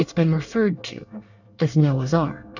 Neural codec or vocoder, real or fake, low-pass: codec, 24 kHz, 1 kbps, SNAC; fake; 7.2 kHz